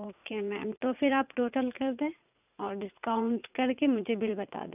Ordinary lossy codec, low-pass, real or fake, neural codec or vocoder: none; 3.6 kHz; fake; vocoder, 44.1 kHz, 80 mel bands, Vocos